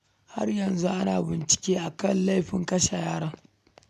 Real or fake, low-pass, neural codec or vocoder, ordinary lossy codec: real; 14.4 kHz; none; none